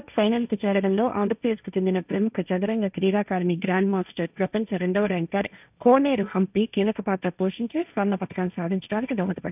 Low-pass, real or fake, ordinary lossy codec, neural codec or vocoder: 3.6 kHz; fake; none; codec, 16 kHz, 1.1 kbps, Voila-Tokenizer